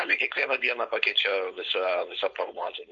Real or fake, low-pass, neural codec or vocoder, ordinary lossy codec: fake; 7.2 kHz; codec, 16 kHz, 4.8 kbps, FACodec; MP3, 48 kbps